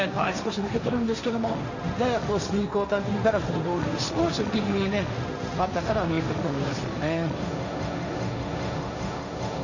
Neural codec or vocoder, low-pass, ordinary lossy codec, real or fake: codec, 16 kHz, 1.1 kbps, Voila-Tokenizer; 7.2 kHz; none; fake